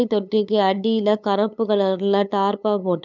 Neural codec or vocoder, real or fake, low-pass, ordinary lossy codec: codec, 16 kHz, 8 kbps, FunCodec, trained on LibriTTS, 25 frames a second; fake; 7.2 kHz; none